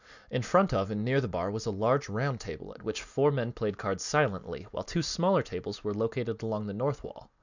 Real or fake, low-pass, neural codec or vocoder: real; 7.2 kHz; none